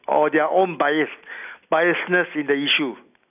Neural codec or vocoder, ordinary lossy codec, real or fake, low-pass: none; none; real; 3.6 kHz